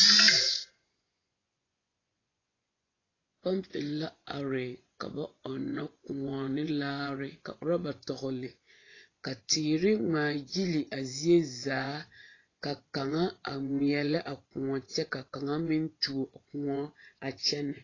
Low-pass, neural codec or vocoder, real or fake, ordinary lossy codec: 7.2 kHz; vocoder, 24 kHz, 100 mel bands, Vocos; fake; AAC, 32 kbps